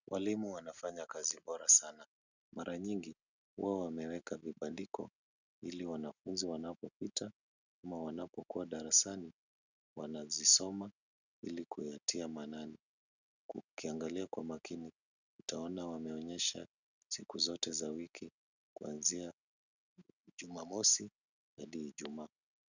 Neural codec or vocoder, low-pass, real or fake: none; 7.2 kHz; real